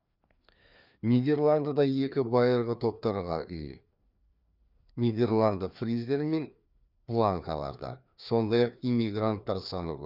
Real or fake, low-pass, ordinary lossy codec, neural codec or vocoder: fake; 5.4 kHz; none; codec, 16 kHz, 2 kbps, FreqCodec, larger model